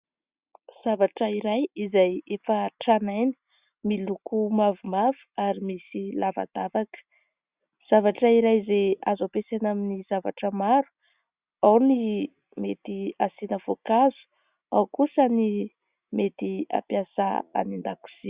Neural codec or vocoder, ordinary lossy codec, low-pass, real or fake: none; Opus, 64 kbps; 3.6 kHz; real